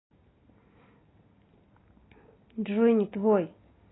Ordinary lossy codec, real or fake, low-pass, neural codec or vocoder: AAC, 16 kbps; real; 7.2 kHz; none